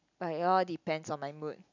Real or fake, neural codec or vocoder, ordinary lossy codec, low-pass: real; none; none; 7.2 kHz